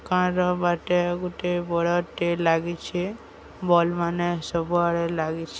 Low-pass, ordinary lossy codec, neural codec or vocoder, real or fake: none; none; none; real